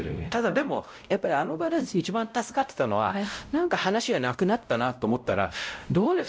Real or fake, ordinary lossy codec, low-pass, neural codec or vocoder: fake; none; none; codec, 16 kHz, 0.5 kbps, X-Codec, WavLM features, trained on Multilingual LibriSpeech